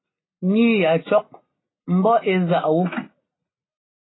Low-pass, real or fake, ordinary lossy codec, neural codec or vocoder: 7.2 kHz; real; AAC, 16 kbps; none